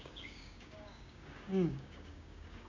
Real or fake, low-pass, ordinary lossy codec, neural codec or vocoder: fake; 7.2 kHz; MP3, 48 kbps; codec, 16 kHz, 6 kbps, DAC